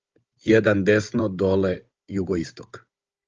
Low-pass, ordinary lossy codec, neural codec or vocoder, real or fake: 7.2 kHz; Opus, 24 kbps; codec, 16 kHz, 16 kbps, FunCodec, trained on Chinese and English, 50 frames a second; fake